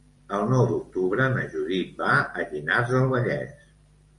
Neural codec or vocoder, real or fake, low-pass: vocoder, 44.1 kHz, 128 mel bands every 512 samples, BigVGAN v2; fake; 10.8 kHz